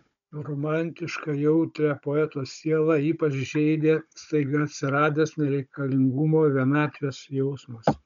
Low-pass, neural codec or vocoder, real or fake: 7.2 kHz; codec, 16 kHz, 16 kbps, FunCodec, trained on Chinese and English, 50 frames a second; fake